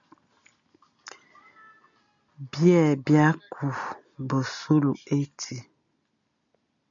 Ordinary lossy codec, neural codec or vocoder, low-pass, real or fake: MP3, 96 kbps; none; 7.2 kHz; real